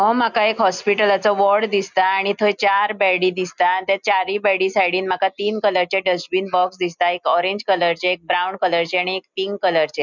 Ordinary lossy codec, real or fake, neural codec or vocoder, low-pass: none; real; none; 7.2 kHz